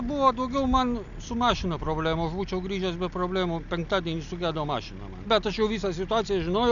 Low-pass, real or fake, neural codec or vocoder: 7.2 kHz; real; none